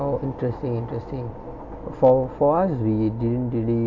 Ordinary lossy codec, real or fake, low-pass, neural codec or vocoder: AAC, 48 kbps; real; 7.2 kHz; none